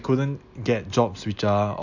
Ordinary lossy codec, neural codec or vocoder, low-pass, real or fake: none; none; 7.2 kHz; real